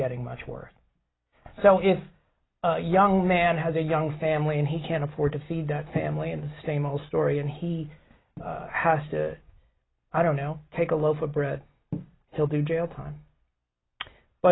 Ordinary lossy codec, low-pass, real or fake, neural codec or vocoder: AAC, 16 kbps; 7.2 kHz; real; none